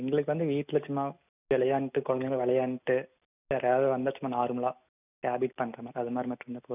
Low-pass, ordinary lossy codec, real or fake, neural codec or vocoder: 3.6 kHz; none; real; none